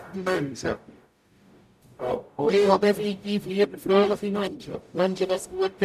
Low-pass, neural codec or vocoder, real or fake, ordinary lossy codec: 14.4 kHz; codec, 44.1 kHz, 0.9 kbps, DAC; fake; none